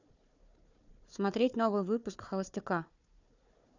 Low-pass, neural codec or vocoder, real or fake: 7.2 kHz; codec, 16 kHz, 4 kbps, FunCodec, trained on Chinese and English, 50 frames a second; fake